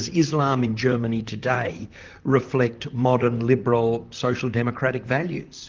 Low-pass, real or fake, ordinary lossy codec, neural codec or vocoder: 7.2 kHz; fake; Opus, 24 kbps; vocoder, 44.1 kHz, 128 mel bands, Pupu-Vocoder